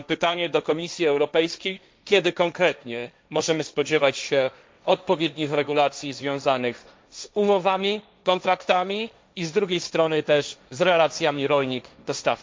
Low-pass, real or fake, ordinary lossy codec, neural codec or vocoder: none; fake; none; codec, 16 kHz, 1.1 kbps, Voila-Tokenizer